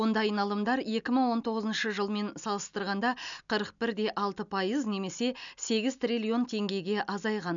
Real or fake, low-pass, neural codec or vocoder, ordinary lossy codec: real; 7.2 kHz; none; none